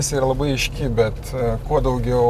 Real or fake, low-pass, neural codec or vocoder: real; 14.4 kHz; none